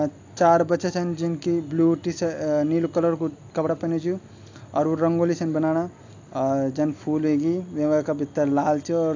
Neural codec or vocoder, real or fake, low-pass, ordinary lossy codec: none; real; 7.2 kHz; AAC, 48 kbps